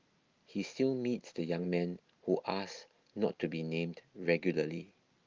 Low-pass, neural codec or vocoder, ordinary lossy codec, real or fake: 7.2 kHz; none; Opus, 24 kbps; real